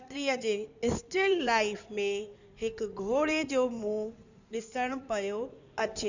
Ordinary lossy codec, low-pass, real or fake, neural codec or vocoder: none; 7.2 kHz; fake; codec, 16 kHz in and 24 kHz out, 2.2 kbps, FireRedTTS-2 codec